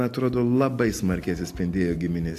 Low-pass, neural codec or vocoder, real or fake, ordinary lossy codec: 14.4 kHz; none; real; AAC, 64 kbps